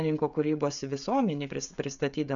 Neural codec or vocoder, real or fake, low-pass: codec, 16 kHz, 16 kbps, FreqCodec, smaller model; fake; 7.2 kHz